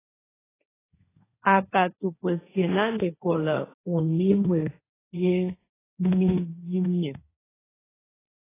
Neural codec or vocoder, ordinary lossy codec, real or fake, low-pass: codec, 16 kHz, 1.1 kbps, Voila-Tokenizer; AAC, 16 kbps; fake; 3.6 kHz